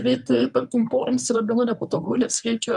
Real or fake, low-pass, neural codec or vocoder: fake; 10.8 kHz; codec, 24 kHz, 0.9 kbps, WavTokenizer, medium speech release version 1